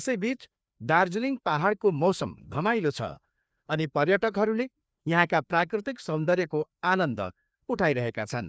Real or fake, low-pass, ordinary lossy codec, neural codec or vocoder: fake; none; none; codec, 16 kHz, 2 kbps, FreqCodec, larger model